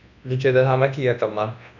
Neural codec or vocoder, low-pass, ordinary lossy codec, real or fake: codec, 24 kHz, 0.9 kbps, WavTokenizer, large speech release; 7.2 kHz; none; fake